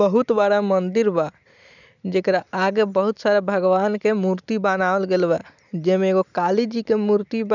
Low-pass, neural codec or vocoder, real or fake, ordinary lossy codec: 7.2 kHz; none; real; none